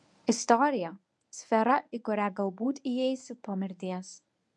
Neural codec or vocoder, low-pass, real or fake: codec, 24 kHz, 0.9 kbps, WavTokenizer, medium speech release version 1; 10.8 kHz; fake